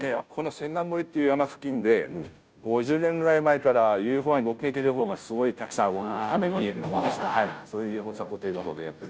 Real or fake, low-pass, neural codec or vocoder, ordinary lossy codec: fake; none; codec, 16 kHz, 0.5 kbps, FunCodec, trained on Chinese and English, 25 frames a second; none